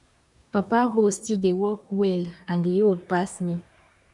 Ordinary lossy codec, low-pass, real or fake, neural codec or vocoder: none; 10.8 kHz; fake; codec, 24 kHz, 1 kbps, SNAC